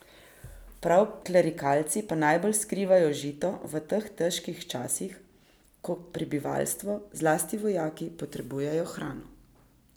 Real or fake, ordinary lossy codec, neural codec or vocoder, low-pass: real; none; none; none